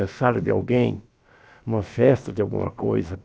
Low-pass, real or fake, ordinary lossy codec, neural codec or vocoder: none; fake; none; codec, 16 kHz, about 1 kbps, DyCAST, with the encoder's durations